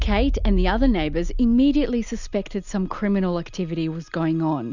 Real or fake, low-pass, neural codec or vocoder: real; 7.2 kHz; none